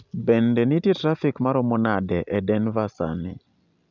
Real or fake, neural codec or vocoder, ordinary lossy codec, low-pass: real; none; none; 7.2 kHz